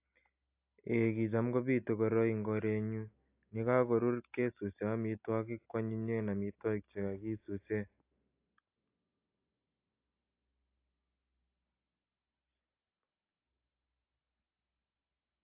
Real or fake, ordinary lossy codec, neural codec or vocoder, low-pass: real; AAC, 32 kbps; none; 3.6 kHz